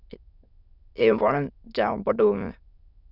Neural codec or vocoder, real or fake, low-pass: autoencoder, 22.05 kHz, a latent of 192 numbers a frame, VITS, trained on many speakers; fake; 5.4 kHz